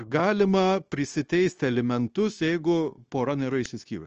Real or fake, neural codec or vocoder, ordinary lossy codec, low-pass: fake; codec, 16 kHz, 0.9 kbps, LongCat-Audio-Codec; Opus, 24 kbps; 7.2 kHz